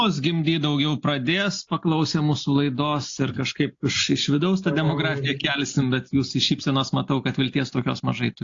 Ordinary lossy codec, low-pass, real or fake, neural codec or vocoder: AAC, 48 kbps; 7.2 kHz; real; none